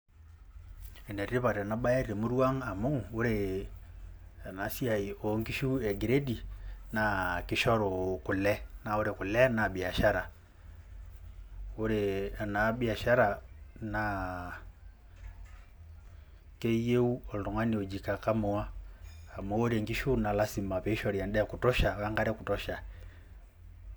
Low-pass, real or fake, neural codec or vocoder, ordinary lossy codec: none; real; none; none